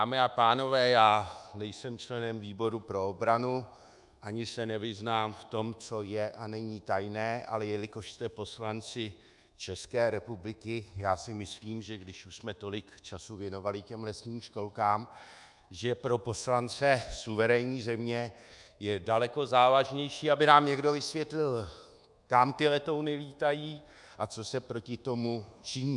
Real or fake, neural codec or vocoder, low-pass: fake; codec, 24 kHz, 1.2 kbps, DualCodec; 10.8 kHz